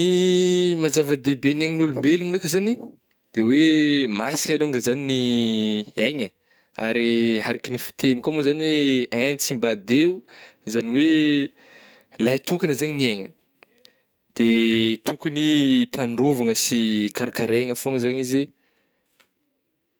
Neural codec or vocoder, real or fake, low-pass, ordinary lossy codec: codec, 44.1 kHz, 2.6 kbps, SNAC; fake; none; none